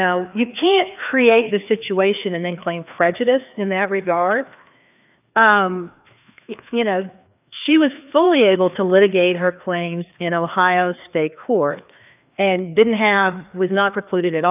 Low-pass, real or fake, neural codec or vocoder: 3.6 kHz; fake; codec, 16 kHz, 2 kbps, FreqCodec, larger model